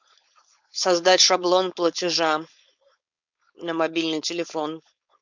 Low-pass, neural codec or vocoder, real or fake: 7.2 kHz; codec, 16 kHz, 4.8 kbps, FACodec; fake